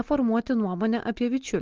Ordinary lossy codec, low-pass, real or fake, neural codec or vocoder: Opus, 16 kbps; 7.2 kHz; real; none